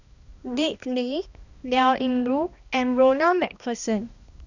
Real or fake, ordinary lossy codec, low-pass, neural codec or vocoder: fake; none; 7.2 kHz; codec, 16 kHz, 1 kbps, X-Codec, HuBERT features, trained on balanced general audio